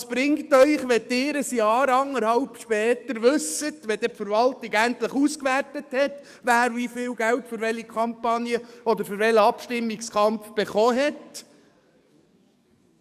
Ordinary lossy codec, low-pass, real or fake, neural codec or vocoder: none; 14.4 kHz; fake; codec, 44.1 kHz, 7.8 kbps, DAC